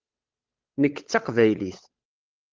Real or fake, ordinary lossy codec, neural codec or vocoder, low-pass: fake; Opus, 32 kbps; codec, 16 kHz, 8 kbps, FunCodec, trained on Chinese and English, 25 frames a second; 7.2 kHz